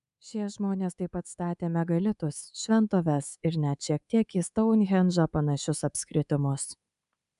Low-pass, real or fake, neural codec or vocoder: 10.8 kHz; fake; codec, 24 kHz, 3.1 kbps, DualCodec